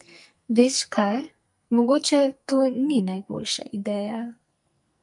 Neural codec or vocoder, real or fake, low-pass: codec, 44.1 kHz, 2.6 kbps, SNAC; fake; 10.8 kHz